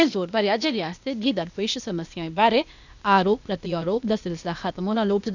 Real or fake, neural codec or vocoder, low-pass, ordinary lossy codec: fake; codec, 16 kHz, 0.8 kbps, ZipCodec; 7.2 kHz; none